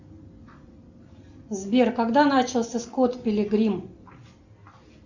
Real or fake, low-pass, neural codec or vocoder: real; 7.2 kHz; none